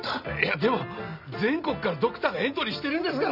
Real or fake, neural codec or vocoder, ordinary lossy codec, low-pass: real; none; none; 5.4 kHz